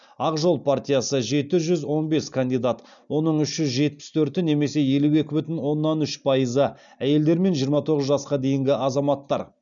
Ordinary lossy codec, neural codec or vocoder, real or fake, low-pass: none; none; real; 7.2 kHz